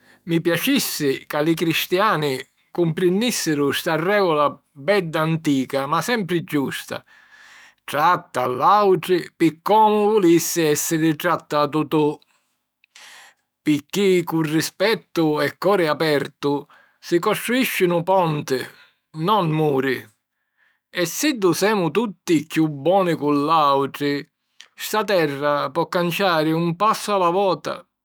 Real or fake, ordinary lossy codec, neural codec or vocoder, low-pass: fake; none; autoencoder, 48 kHz, 128 numbers a frame, DAC-VAE, trained on Japanese speech; none